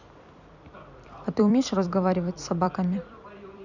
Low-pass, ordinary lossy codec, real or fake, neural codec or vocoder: 7.2 kHz; none; fake; vocoder, 44.1 kHz, 128 mel bands every 256 samples, BigVGAN v2